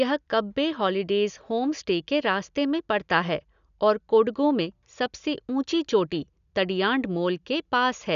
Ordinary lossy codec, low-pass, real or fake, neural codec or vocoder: none; 7.2 kHz; real; none